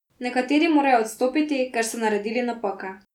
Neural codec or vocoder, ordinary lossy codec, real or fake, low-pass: none; none; real; 19.8 kHz